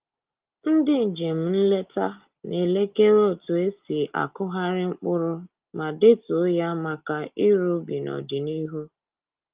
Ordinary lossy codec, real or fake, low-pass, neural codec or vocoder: Opus, 32 kbps; real; 3.6 kHz; none